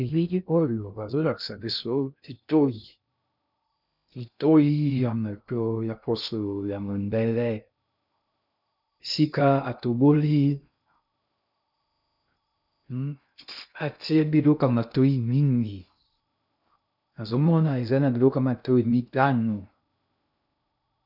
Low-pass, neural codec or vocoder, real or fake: 5.4 kHz; codec, 16 kHz in and 24 kHz out, 0.6 kbps, FocalCodec, streaming, 2048 codes; fake